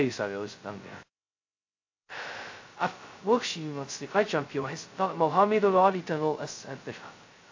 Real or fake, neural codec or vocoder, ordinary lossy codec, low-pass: fake; codec, 16 kHz, 0.2 kbps, FocalCodec; AAC, 48 kbps; 7.2 kHz